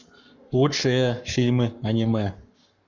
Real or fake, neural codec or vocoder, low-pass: fake; codec, 44.1 kHz, 7.8 kbps, Pupu-Codec; 7.2 kHz